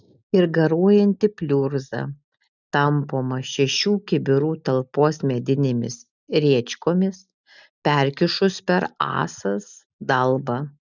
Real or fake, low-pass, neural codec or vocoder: real; 7.2 kHz; none